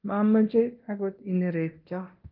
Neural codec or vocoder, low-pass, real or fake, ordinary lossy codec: codec, 24 kHz, 0.9 kbps, DualCodec; 5.4 kHz; fake; Opus, 32 kbps